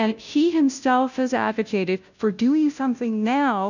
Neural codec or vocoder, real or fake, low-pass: codec, 16 kHz, 0.5 kbps, FunCodec, trained on Chinese and English, 25 frames a second; fake; 7.2 kHz